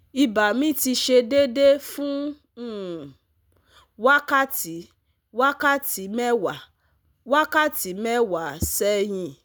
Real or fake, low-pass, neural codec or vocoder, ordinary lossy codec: real; none; none; none